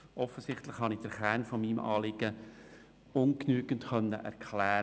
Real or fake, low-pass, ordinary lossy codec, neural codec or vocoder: real; none; none; none